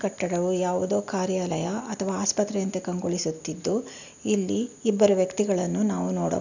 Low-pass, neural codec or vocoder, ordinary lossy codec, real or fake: 7.2 kHz; none; none; real